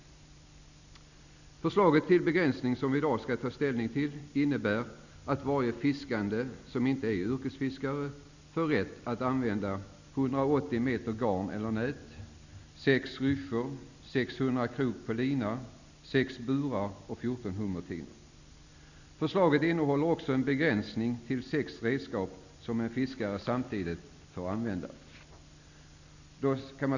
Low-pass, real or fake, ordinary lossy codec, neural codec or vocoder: 7.2 kHz; real; none; none